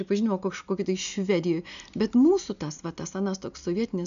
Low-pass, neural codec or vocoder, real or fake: 7.2 kHz; none; real